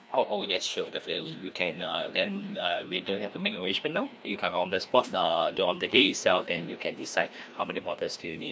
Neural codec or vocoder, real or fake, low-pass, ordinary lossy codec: codec, 16 kHz, 1 kbps, FreqCodec, larger model; fake; none; none